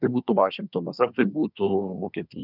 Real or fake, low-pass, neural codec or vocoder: fake; 5.4 kHz; codec, 24 kHz, 1 kbps, SNAC